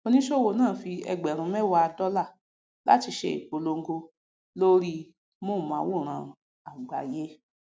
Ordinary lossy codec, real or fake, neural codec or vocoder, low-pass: none; real; none; none